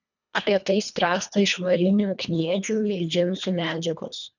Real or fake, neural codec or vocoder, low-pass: fake; codec, 24 kHz, 1.5 kbps, HILCodec; 7.2 kHz